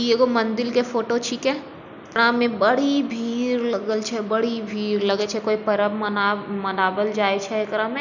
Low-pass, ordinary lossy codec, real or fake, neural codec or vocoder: 7.2 kHz; none; real; none